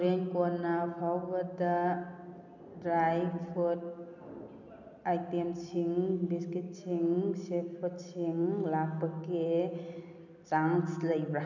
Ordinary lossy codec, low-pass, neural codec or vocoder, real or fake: none; 7.2 kHz; none; real